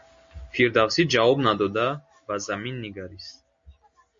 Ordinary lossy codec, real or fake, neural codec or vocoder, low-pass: MP3, 48 kbps; real; none; 7.2 kHz